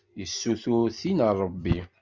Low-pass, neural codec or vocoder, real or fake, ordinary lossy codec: 7.2 kHz; none; real; Opus, 64 kbps